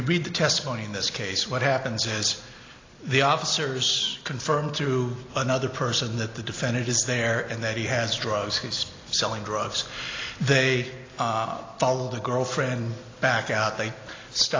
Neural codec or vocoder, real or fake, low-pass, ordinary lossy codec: none; real; 7.2 kHz; AAC, 32 kbps